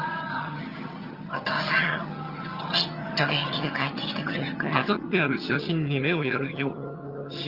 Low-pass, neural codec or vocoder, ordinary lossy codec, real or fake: 5.4 kHz; vocoder, 22.05 kHz, 80 mel bands, HiFi-GAN; Opus, 32 kbps; fake